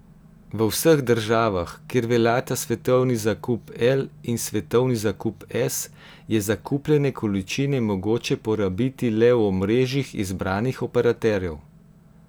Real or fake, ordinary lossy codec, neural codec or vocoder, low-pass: real; none; none; none